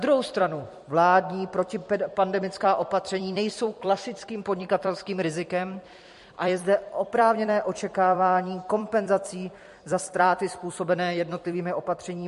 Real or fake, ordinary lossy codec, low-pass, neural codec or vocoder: fake; MP3, 48 kbps; 14.4 kHz; vocoder, 44.1 kHz, 128 mel bands every 256 samples, BigVGAN v2